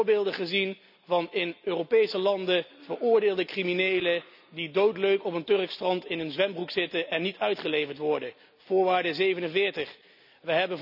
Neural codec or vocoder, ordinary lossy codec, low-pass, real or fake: none; none; 5.4 kHz; real